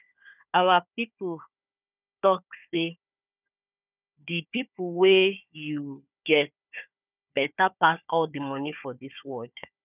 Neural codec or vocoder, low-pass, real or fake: codec, 16 kHz, 4 kbps, FunCodec, trained on Chinese and English, 50 frames a second; 3.6 kHz; fake